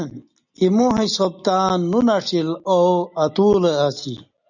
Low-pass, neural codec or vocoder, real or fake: 7.2 kHz; none; real